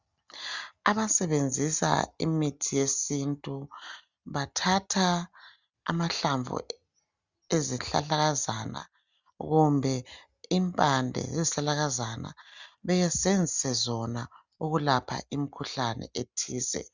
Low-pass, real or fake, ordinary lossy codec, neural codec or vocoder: 7.2 kHz; real; Opus, 64 kbps; none